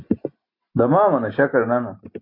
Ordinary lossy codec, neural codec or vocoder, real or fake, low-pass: AAC, 32 kbps; none; real; 5.4 kHz